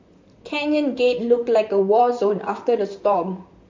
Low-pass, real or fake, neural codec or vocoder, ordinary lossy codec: 7.2 kHz; fake; vocoder, 44.1 kHz, 128 mel bands, Pupu-Vocoder; MP3, 48 kbps